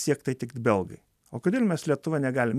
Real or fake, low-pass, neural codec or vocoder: real; 14.4 kHz; none